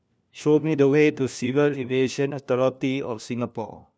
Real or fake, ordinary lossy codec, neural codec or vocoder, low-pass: fake; none; codec, 16 kHz, 1 kbps, FunCodec, trained on LibriTTS, 50 frames a second; none